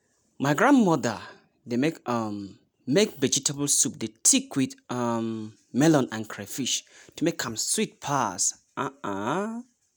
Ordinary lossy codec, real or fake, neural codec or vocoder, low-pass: none; real; none; none